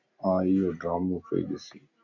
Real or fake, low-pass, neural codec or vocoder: real; 7.2 kHz; none